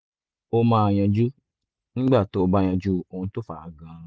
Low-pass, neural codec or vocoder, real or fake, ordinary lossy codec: none; none; real; none